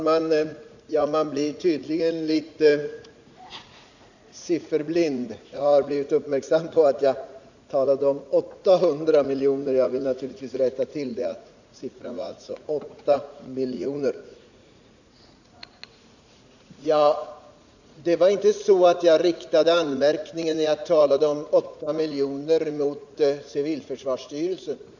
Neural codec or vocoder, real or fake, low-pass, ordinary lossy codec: vocoder, 44.1 kHz, 80 mel bands, Vocos; fake; 7.2 kHz; none